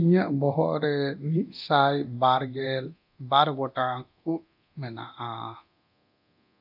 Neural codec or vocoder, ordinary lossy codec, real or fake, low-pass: codec, 24 kHz, 0.9 kbps, DualCodec; AAC, 48 kbps; fake; 5.4 kHz